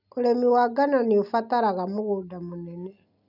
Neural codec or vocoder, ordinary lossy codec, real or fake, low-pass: none; none; real; 5.4 kHz